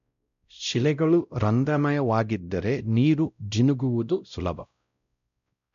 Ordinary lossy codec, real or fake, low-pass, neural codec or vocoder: none; fake; 7.2 kHz; codec, 16 kHz, 0.5 kbps, X-Codec, WavLM features, trained on Multilingual LibriSpeech